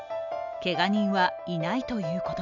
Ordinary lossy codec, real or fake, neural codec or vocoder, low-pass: none; real; none; 7.2 kHz